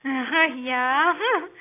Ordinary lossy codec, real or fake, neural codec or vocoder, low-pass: AAC, 24 kbps; real; none; 3.6 kHz